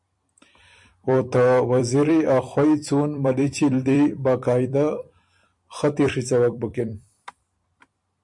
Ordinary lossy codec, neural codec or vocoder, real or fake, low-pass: MP3, 64 kbps; vocoder, 44.1 kHz, 128 mel bands every 256 samples, BigVGAN v2; fake; 10.8 kHz